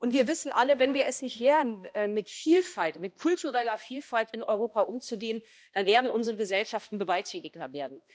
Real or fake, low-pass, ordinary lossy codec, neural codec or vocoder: fake; none; none; codec, 16 kHz, 1 kbps, X-Codec, HuBERT features, trained on balanced general audio